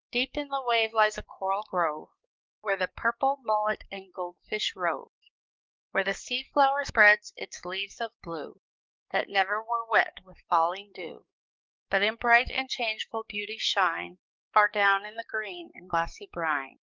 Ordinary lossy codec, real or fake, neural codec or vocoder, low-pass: Opus, 32 kbps; fake; codec, 44.1 kHz, 7.8 kbps, DAC; 7.2 kHz